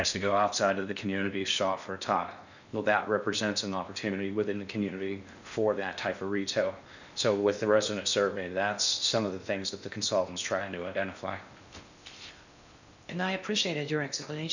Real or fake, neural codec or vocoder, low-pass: fake; codec, 16 kHz in and 24 kHz out, 0.6 kbps, FocalCodec, streaming, 4096 codes; 7.2 kHz